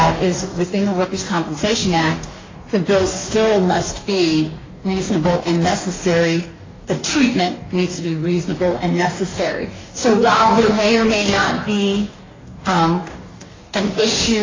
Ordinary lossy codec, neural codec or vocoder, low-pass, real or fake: AAC, 32 kbps; codec, 44.1 kHz, 2.6 kbps, DAC; 7.2 kHz; fake